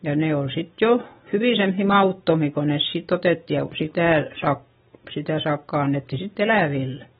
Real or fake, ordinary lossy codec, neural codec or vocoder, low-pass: real; AAC, 16 kbps; none; 7.2 kHz